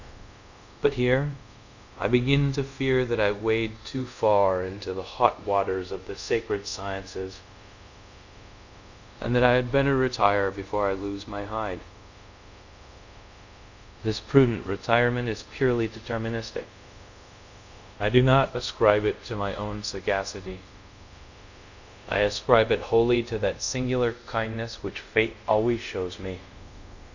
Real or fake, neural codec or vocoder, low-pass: fake; codec, 24 kHz, 0.5 kbps, DualCodec; 7.2 kHz